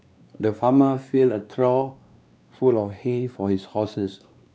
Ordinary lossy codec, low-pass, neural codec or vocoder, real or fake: none; none; codec, 16 kHz, 2 kbps, X-Codec, WavLM features, trained on Multilingual LibriSpeech; fake